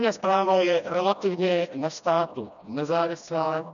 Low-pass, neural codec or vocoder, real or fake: 7.2 kHz; codec, 16 kHz, 1 kbps, FreqCodec, smaller model; fake